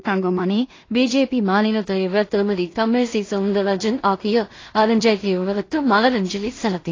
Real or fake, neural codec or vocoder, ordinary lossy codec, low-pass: fake; codec, 16 kHz in and 24 kHz out, 0.4 kbps, LongCat-Audio-Codec, two codebook decoder; AAC, 32 kbps; 7.2 kHz